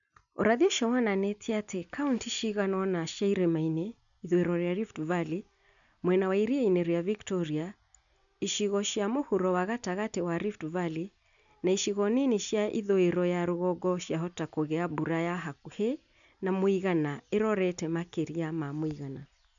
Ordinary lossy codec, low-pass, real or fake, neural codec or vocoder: none; 7.2 kHz; real; none